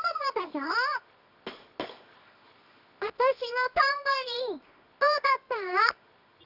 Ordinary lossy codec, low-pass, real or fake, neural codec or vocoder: none; 5.4 kHz; fake; codec, 24 kHz, 0.9 kbps, WavTokenizer, medium music audio release